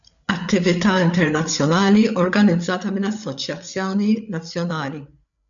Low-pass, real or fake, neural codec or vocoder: 7.2 kHz; fake; codec, 16 kHz, 8 kbps, FreqCodec, larger model